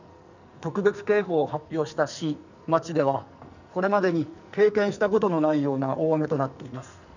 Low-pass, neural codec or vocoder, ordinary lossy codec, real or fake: 7.2 kHz; codec, 44.1 kHz, 2.6 kbps, SNAC; none; fake